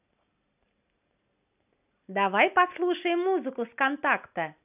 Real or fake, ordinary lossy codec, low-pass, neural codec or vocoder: real; none; 3.6 kHz; none